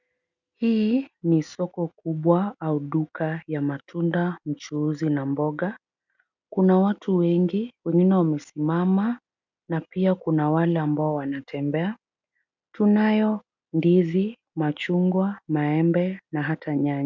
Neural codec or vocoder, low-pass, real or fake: none; 7.2 kHz; real